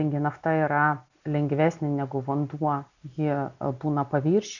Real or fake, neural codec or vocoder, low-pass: real; none; 7.2 kHz